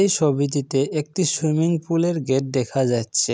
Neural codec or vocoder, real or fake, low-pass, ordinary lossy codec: none; real; none; none